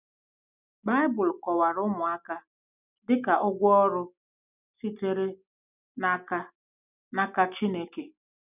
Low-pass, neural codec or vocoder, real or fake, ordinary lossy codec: 3.6 kHz; none; real; none